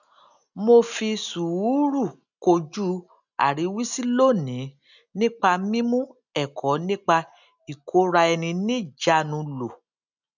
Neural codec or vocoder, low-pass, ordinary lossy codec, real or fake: none; 7.2 kHz; none; real